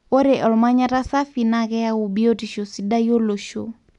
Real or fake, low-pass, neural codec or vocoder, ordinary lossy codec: real; 10.8 kHz; none; none